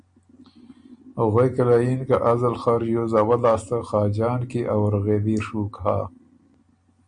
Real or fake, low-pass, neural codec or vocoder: real; 9.9 kHz; none